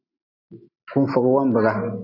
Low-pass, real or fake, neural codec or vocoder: 5.4 kHz; real; none